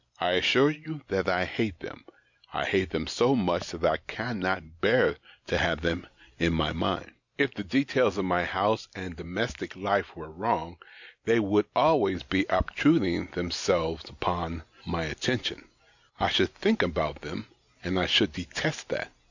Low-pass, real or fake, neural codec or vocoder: 7.2 kHz; real; none